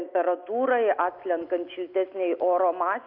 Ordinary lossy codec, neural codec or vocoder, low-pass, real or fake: AAC, 48 kbps; none; 5.4 kHz; real